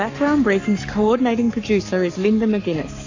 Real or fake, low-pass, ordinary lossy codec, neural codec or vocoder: fake; 7.2 kHz; AAC, 48 kbps; codec, 44.1 kHz, 7.8 kbps, Pupu-Codec